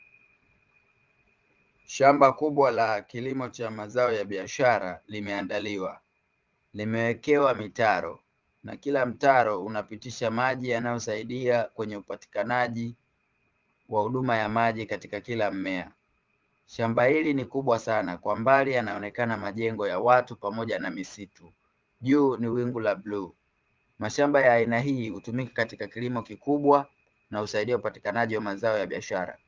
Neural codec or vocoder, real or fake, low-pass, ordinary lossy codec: vocoder, 22.05 kHz, 80 mel bands, Vocos; fake; 7.2 kHz; Opus, 32 kbps